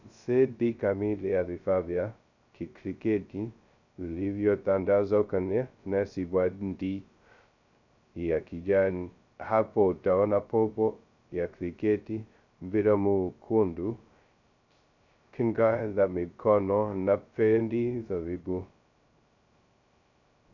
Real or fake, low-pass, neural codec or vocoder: fake; 7.2 kHz; codec, 16 kHz, 0.2 kbps, FocalCodec